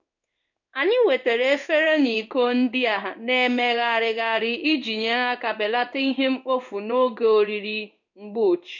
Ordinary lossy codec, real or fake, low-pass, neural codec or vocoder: none; fake; 7.2 kHz; codec, 16 kHz in and 24 kHz out, 1 kbps, XY-Tokenizer